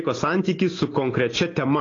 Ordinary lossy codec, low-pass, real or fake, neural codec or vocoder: AAC, 32 kbps; 7.2 kHz; real; none